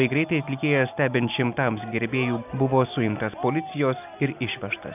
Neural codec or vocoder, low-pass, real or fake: none; 3.6 kHz; real